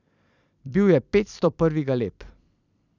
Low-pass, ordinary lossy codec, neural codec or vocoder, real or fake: 7.2 kHz; none; vocoder, 44.1 kHz, 128 mel bands every 256 samples, BigVGAN v2; fake